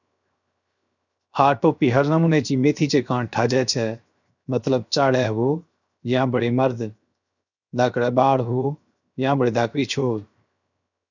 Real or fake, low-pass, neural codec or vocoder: fake; 7.2 kHz; codec, 16 kHz, 0.7 kbps, FocalCodec